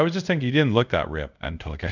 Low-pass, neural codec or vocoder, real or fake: 7.2 kHz; codec, 24 kHz, 0.5 kbps, DualCodec; fake